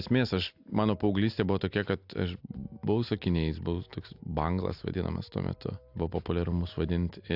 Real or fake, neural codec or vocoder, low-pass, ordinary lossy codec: real; none; 5.4 kHz; AAC, 48 kbps